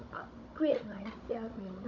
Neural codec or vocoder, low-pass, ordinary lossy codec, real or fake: codec, 16 kHz, 16 kbps, FunCodec, trained on Chinese and English, 50 frames a second; 7.2 kHz; none; fake